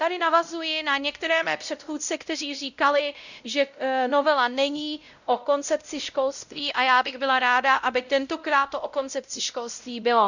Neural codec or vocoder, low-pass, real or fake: codec, 16 kHz, 0.5 kbps, X-Codec, WavLM features, trained on Multilingual LibriSpeech; 7.2 kHz; fake